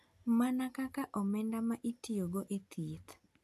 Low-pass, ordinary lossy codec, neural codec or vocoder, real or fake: 14.4 kHz; MP3, 96 kbps; none; real